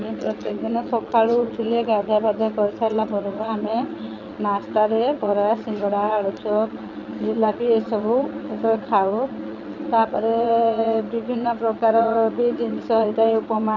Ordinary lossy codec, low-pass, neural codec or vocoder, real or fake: none; 7.2 kHz; vocoder, 22.05 kHz, 80 mel bands, Vocos; fake